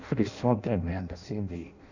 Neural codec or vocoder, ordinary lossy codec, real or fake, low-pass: codec, 16 kHz in and 24 kHz out, 0.6 kbps, FireRedTTS-2 codec; none; fake; 7.2 kHz